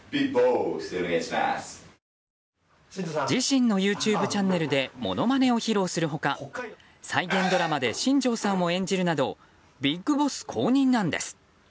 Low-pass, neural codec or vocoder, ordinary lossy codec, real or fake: none; none; none; real